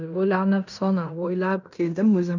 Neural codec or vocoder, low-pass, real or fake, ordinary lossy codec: codec, 16 kHz in and 24 kHz out, 0.9 kbps, LongCat-Audio-Codec, fine tuned four codebook decoder; 7.2 kHz; fake; none